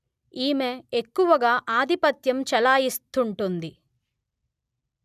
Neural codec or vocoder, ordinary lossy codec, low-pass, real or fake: none; none; 14.4 kHz; real